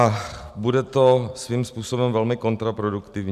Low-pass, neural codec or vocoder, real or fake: 14.4 kHz; none; real